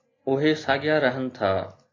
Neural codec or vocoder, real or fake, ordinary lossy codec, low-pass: none; real; AAC, 32 kbps; 7.2 kHz